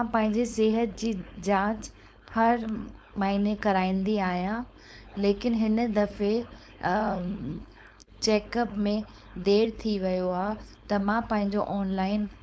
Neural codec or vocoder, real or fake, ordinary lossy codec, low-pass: codec, 16 kHz, 4.8 kbps, FACodec; fake; none; none